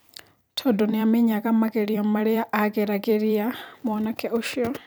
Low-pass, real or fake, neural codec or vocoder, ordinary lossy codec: none; fake; vocoder, 44.1 kHz, 128 mel bands every 256 samples, BigVGAN v2; none